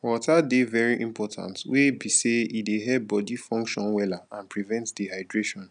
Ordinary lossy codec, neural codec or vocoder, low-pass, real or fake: none; none; 9.9 kHz; real